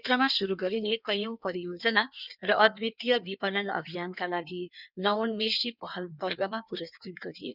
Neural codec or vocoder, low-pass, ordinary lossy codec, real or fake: codec, 16 kHz in and 24 kHz out, 1.1 kbps, FireRedTTS-2 codec; 5.4 kHz; none; fake